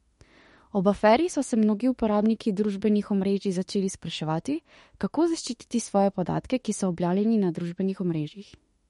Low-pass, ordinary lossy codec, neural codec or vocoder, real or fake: 19.8 kHz; MP3, 48 kbps; autoencoder, 48 kHz, 32 numbers a frame, DAC-VAE, trained on Japanese speech; fake